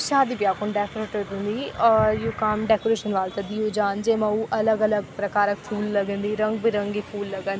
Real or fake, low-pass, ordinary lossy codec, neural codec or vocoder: real; none; none; none